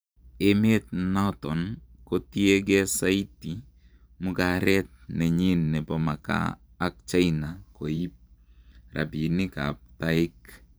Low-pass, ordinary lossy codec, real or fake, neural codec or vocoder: none; none; fake; vocoder, 44.1 kHz, 128 mel bands every 512 samples, BigVGAN v2